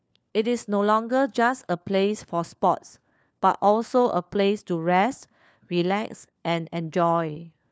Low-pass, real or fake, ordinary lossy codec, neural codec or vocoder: none; fake; none; codec, 16 kHz, 4 kbps, FunCodec, trained on LibriTTS, 50 frames a second